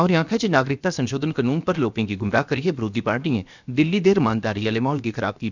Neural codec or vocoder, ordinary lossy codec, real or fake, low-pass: codec, 16 kHz, about 1 kbps, DyCAST, with the encoder's durations; none; fake; 7.2 kHz